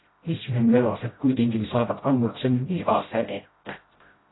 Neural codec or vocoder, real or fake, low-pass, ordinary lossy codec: codec, 16 kHz, 0.5 kbps, FreqCodec, smaller model; fake; 7.2 kHz; AAC, 16 kbps